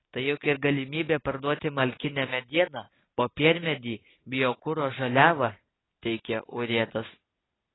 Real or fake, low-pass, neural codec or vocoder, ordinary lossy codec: real; 7.2 kHz; none; AAC, 16 kbps